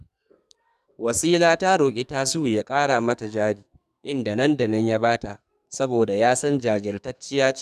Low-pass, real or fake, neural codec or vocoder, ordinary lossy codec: 14.4 kHz; fake; codec, 44.1 kHz, 2.6 kbps, SNAC; none